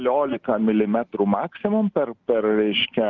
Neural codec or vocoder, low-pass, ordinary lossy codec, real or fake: none; 7.2 kHz; Opus, 32 kbps; real